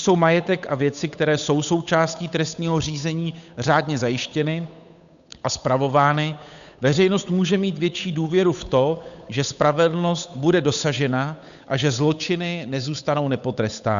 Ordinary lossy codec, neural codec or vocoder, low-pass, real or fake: MP3, 96 kbps; codec, 16 kHz, 8 kbps, FunCodec, trained on Chinese and English, 25 frames a second; 7.2 kHz; fake